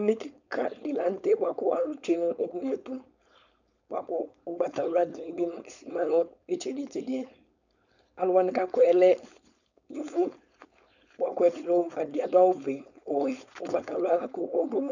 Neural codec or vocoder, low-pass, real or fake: codec, 16 kHz, 4.8 kbps, FACodec; 7.2 kHz; fake